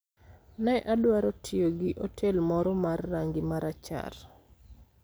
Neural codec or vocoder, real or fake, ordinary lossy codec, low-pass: none; real; none; none